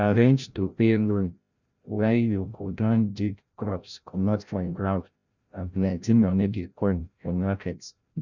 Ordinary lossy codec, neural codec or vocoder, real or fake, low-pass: none; codec, 16 kHz, 0.5 kbps, FreqCodec, larger model; fake; 7.2 kHz